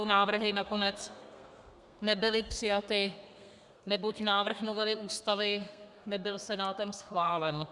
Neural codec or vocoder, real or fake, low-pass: codec, 32 kHz, 1.9 kbps, SNAC; fake; 10.8 kHz